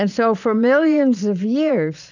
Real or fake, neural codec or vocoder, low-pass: fake; vocoder, 22.05 kHz, 80 mel bands, Vocos; 7.2 kHz